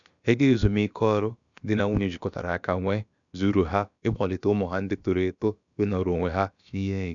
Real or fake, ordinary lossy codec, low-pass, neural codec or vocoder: fake; none; 7.2 kHz; codec, 16 kHz, about 1 kbps, DyCAST, with the encoder's durations